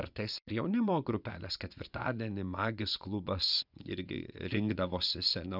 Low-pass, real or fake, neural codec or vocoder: 5.4 kHz; fake; vocoder, 24 kHz, 100 mel bands, Vocos